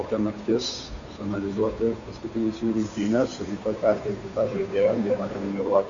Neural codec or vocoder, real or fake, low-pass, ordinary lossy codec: codec, 16 kHz, 2 kbps, FunCodec, trained on Chinese and English, 25 frames a second; fake; 7.2 kHz; MP3, 64 kbps